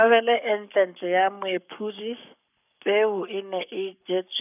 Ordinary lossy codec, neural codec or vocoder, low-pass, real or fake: none; codec, 44.1 kHz, 7.8 kbps, Pupu-Codec; 3.6 kHz; fake